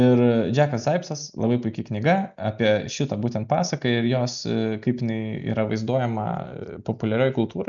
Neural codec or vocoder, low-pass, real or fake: none; 7.2 kHz; real